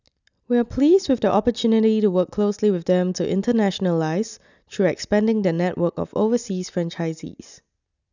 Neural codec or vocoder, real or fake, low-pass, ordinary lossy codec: none; real; 7.2 kHz; none